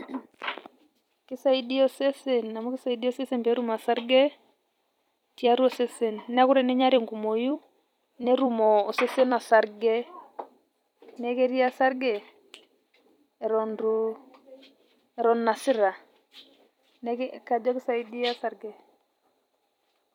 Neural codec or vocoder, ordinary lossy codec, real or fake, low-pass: vocoder, 44.1 kHz, 128 mel bands every 256 samples, BigVGAN v2; none; fake; 19.8 kHz